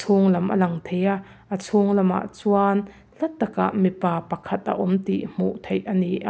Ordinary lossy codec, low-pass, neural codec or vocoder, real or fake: none; none; none; real